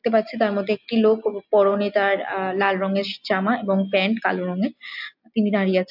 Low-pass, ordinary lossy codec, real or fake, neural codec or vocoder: 5.4 kHz; AAC, 48 kbps; real; none